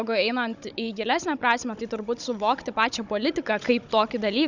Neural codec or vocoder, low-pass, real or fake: codec, 16 kHz, 16 kbps, FunCodec, trained on Chinese and English, 50 frames a second; 7.2 kHz; fake